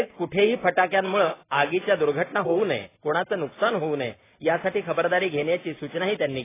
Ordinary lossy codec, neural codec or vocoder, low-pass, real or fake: AAC, 16 kbps; none; 3.6 kHz; real